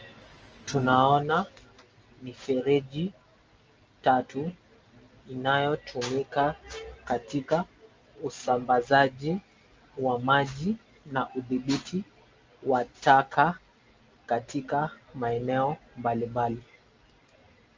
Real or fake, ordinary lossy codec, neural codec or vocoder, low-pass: real; Opus, 24 kbps; none; 7.2 kHz